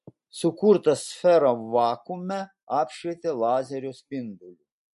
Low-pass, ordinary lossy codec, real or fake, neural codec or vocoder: 14.4 kHz; MP3, 48 kbps; real; none